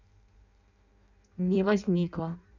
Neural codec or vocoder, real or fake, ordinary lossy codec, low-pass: codec, 16 kHz in and 24 kHz out, 0.6 kbps, FireRedTTS-2 codec; fake; none; 7.2 kHz